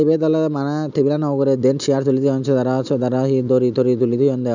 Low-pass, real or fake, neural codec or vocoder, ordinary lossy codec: 7.2 kHz; real; none; none